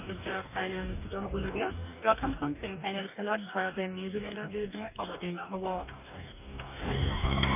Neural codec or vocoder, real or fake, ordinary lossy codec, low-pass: codec, 44.1 kHz, 2.6 kbps, DAC; fake; none; 3.6 kHz